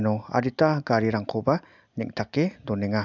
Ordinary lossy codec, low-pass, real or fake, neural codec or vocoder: none; 7.2 kHz; real; none